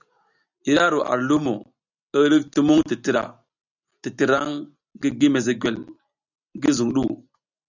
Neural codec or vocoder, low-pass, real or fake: none; 7.2 kHz; real